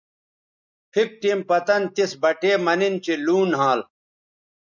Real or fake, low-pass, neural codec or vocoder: real; 7.2 kHz; none